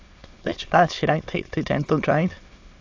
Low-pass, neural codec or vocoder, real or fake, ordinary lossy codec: 7.2 kHz; autoencoder, 22.05 kHz, a latent of 192 numbers a frame, VITS, trained on many speakers; fake; AAC, 48 kbps